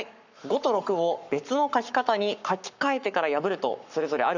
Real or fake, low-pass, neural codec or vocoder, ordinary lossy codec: fake; 7.2 kHz; codec, 44.1 kHz, 7.8 kbps, Pupu-Codec; none